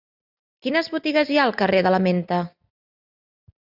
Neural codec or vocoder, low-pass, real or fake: none; 5.4 kHz; real